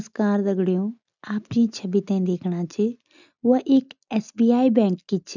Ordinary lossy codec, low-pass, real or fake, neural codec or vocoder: none; 7.2 kHz; real; none